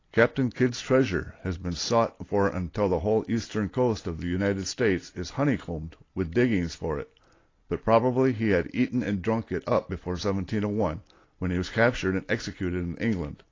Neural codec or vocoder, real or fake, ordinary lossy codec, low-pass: none; real; AAC, 32 kbps; 7.2 kHz